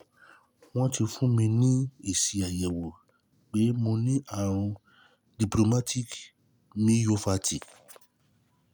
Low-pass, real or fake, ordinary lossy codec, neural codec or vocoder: none; real; none; none